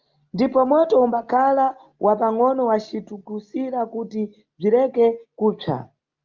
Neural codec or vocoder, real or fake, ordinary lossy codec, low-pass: none; real; Opus, 32 kbps; 7.2 kHz